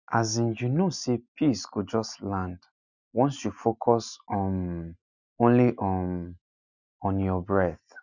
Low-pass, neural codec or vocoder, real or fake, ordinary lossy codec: 7.2 kHz; none; real; none